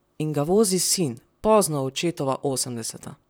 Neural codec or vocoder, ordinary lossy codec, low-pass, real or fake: vocoder, 44.1 kHz, 128 mel bands, Pupu-Vocoder; none; none; fake